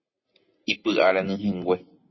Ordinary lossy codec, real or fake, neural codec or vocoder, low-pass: MP3, 24 kbps; real; none; 7.2 kHz